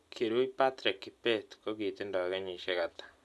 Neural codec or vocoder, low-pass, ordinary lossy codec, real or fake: none; none; none; real